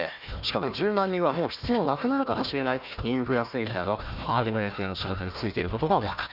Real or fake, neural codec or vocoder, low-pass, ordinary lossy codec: fake; codec, 16 kHz, 1 kbps, FunCodec, trained on Chinese and English, 50 frames a second; 5.4 kHz; none